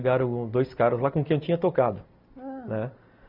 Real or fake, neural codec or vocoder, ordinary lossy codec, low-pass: real; none; none; 5.4 kHz